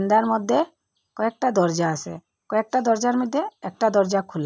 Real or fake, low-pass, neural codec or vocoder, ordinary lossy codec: real; none; none; none